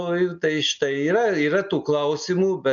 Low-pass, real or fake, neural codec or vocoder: 7.2 kHz; real; none